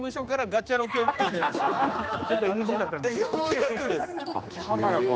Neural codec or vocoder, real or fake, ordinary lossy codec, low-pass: codec, 16 kHz, 2 kbps, X-Codec, HuBERT features, trained on general audio; fake; none; none